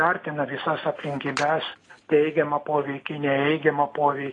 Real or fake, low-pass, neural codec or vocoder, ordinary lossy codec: real; 10.8 kHz; none; AAC, 32 kbps